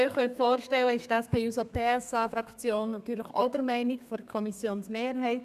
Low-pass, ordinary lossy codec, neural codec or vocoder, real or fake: 14.4 kHz; none; codec, 32 kHz, 1.9 kbps, SNAC; fake